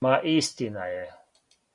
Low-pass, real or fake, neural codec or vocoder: 10.8 kHz; real; none